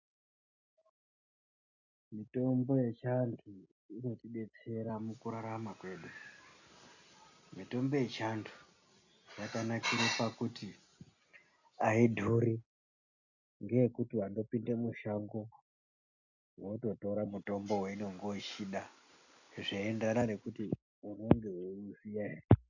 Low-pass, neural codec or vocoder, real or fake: 7.2 kHz; none; real